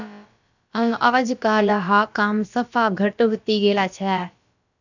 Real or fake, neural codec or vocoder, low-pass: fake; codec, 16 kHz, about 1 kbps, DyCAST, with the encoder's durations; 7.2 kHz